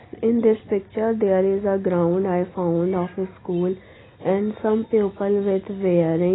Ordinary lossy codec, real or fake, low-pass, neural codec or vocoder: AAC, 16 kbps; real; 7.2 kHz; none